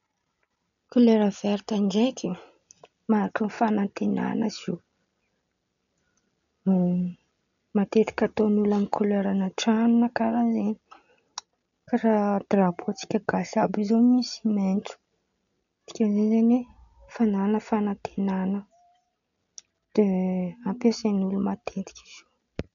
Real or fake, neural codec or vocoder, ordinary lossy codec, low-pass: real; none; none; 7.2 kHz